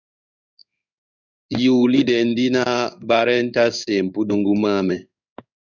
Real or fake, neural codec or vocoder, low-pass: fake; codec, 16 kHz in and 24 kHz out, 1 kbps, XY-Tokenizer; 7.2 kHz